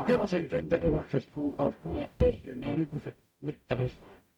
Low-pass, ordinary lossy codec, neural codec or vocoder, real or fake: 19.8 kHz; MP3, 96 kbps; codec, 44.1 kHz, 0.9 kbps, DAC; fake